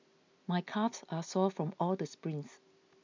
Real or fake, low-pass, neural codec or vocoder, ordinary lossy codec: real; 7.2 kHz; none; MP3, 64 kbps